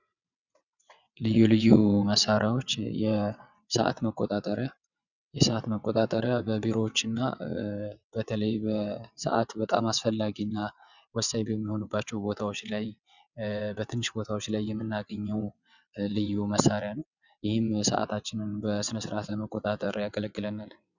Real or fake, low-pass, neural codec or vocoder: fake; 7.2 kHz; vocoder, 22.05 kHz, 80 mel bands, WaveNeXt